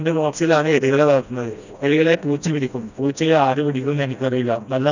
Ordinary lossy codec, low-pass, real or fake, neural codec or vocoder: none; 7.2 kHz; fake; codec, 16 kHz, 1 kbps, FreqCodec, smaller model